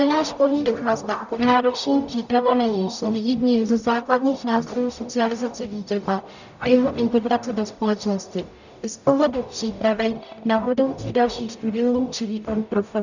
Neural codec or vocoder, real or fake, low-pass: codec, 44.1 kHz, 0.9 kbps, DAC; fake; 7.2 kHz